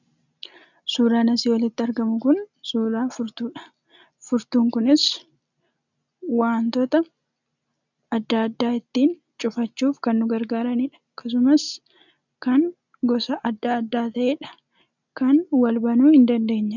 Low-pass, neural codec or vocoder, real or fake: 7.2 kHz; none; real